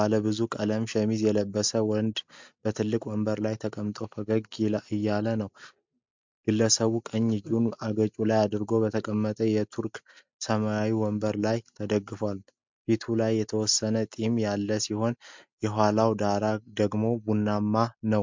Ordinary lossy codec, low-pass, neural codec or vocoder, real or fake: MP3, 64 kbps; 7.2 kHz; none; real